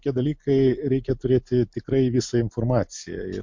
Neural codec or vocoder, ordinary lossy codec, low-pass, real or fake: none; MP3, 48 kbps; 7.2 kHz; real